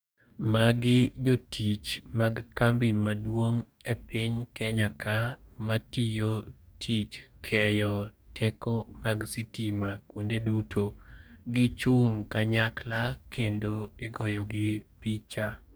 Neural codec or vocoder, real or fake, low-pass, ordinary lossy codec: codec, 44.1 kHz, 2.6 kbps, DAC; fake; none; none